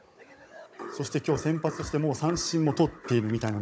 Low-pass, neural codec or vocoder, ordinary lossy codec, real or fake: none; codec, 16 kHz, 16 kbps, FunCodec, trained on Chinese and English, 50 frames a second; none; fake